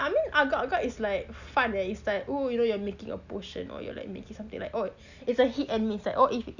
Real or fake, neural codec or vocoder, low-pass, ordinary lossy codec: real; none; 7.2 kHz; none